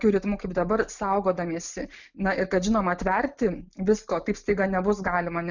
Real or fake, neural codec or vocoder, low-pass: real; none; 7.2 kHz